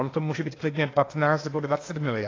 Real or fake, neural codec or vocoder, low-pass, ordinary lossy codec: fake; codec, 16 kHz, 0.8 kbps, ZipCodec; 7.2 kHz; AAC, 32 kbps